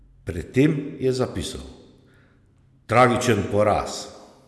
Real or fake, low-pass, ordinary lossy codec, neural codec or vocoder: real; none; none; none